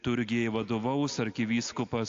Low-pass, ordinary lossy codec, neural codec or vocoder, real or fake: 7.2 kHz; AAC, 48 kbps; none; real